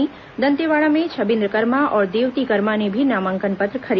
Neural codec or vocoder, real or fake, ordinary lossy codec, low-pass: none; real; none; 7.2 kHz